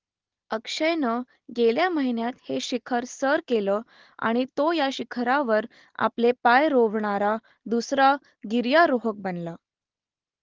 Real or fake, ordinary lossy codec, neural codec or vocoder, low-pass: real; Opus, 16 kbps; none; 7.2 kHz